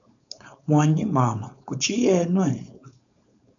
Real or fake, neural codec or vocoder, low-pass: fake; codec, 16 kHz, 4.8 kbps, FACodec; 7.2 kHz